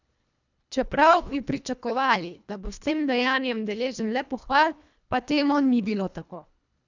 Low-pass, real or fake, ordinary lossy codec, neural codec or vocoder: 7.2 kHz; fake; none; codec, 24 kHz, 1.5 kbps, HILCodec